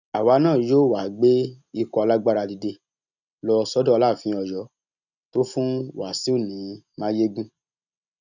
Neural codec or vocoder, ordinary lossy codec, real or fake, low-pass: none; none; real; 7.2 kHz